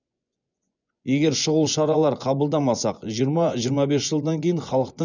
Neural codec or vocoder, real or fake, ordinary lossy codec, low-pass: vocoder, 24 kHz, 100 mel bands, Vocos; fake; none; 7.2 kHz